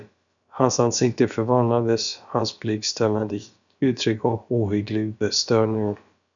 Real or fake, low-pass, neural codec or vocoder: fake; 7.2 kHz; codec, 16 kHz, about 1 kbps, DyCAST, with the encoder's durations